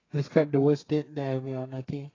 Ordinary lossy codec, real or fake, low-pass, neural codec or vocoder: AAC, 32 kbps; fake; 7.2 kHz; codec, 32 kHz, 1.9 kbps, SNAC